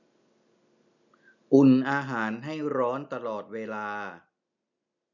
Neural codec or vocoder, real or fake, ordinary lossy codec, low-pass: none; real; none; 7.2 kHz